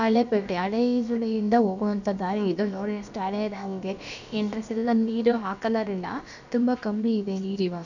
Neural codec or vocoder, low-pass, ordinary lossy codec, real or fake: codec, 16 kHz, about 1 kbps, DyCAST, with the encoder's durations; 7.2 kHz; none; fake